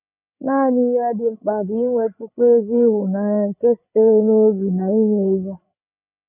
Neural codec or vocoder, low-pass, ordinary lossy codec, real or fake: codec, 16 kHz, 16 kbps, FreqCodec, larger model; 3.6 kHz; none; fake